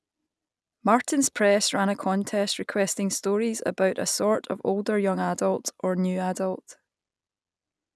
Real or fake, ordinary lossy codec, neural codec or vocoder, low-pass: real; none; none; none